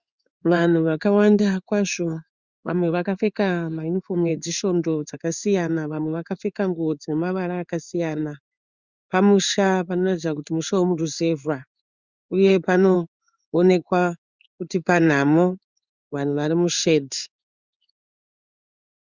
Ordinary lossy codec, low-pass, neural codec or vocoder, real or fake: Opus, 64 kbps; 7.2 kHz; codec, 16 kHz in and 24 kHz out, 1 kbps, XY-Tokenizer; fake